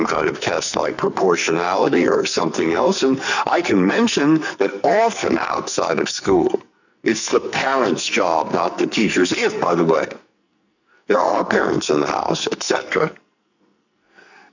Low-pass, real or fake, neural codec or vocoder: 7.2 kHz; fake; codec, 44.1 kHz, 2.6 kbps, SNAC